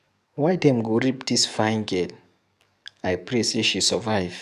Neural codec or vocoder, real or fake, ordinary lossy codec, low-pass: codec, 44.1 kHz, 7.8 kbps, DAC; fake; none; 14.4 kHz